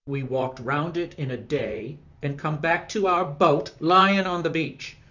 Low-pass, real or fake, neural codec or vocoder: 7.2 kHz; fake; vocoder, 44.1 kHz, 128 mel bands, Pupu-Vocoder